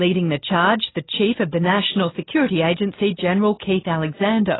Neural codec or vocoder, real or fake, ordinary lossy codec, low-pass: none; real; AAC, 16 kbps; 7.2 kHz